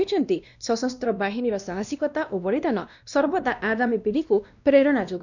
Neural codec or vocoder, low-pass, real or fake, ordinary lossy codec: codec, 16 kHz, 1 kbps, X-Codec, WavLM features, trained on Multilingual LibriSpeech; 7.2 kHz; fake; none